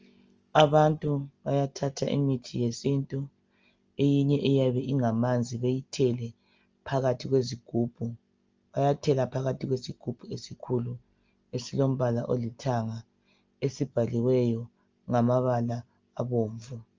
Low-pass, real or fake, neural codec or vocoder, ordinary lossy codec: 7.2 kHz; real; none; Opus, 24 kbps